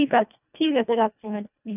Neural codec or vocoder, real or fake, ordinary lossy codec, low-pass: codec, 24 kHz, 1.5 kbps, HILCodec; fake; none; 3.6 kHz